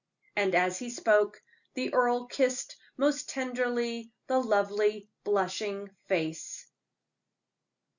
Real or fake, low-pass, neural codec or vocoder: real; 7.2 kHz; none